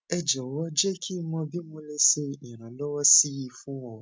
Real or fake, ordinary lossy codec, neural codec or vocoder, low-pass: real; none; none; none